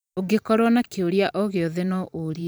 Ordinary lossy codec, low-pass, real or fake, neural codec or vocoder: none; none; fake; vocoder, 44.1 kHz, 128 mel bands every 512 samples, BigVGAN v2